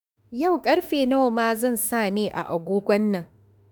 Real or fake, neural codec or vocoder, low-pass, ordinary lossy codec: fake; autoencoder, 48 kHz, 32 numbers a frame, DAC-VAE, trained on Japanese speech; none; none